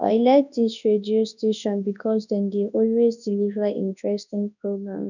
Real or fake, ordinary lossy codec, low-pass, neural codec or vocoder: fake; none; 7.2 kHz; codec, 24 kHz, 0.9 kbps, WavTokenizer, large speech release